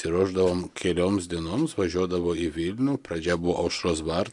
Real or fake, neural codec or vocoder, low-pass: real; none; 10.8 kHz